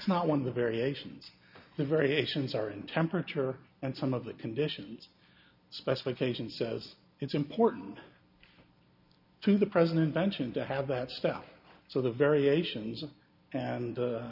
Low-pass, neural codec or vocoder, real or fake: 5.4 kHz; none; real